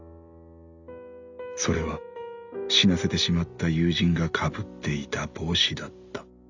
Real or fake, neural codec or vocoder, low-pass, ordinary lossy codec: real; none; 7.2 kHz; none